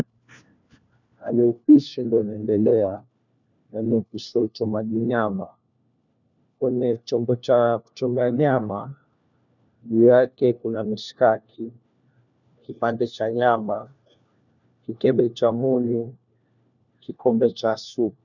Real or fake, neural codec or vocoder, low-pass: fake; codec, 16 kHz, 1 kbps, FunCodec, trained on LibriTTS, 50 frames a second; 7.2 kHz